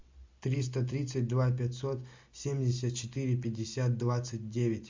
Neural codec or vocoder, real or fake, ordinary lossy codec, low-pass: none; real; MP3, 64 kbps; 7.2 kHz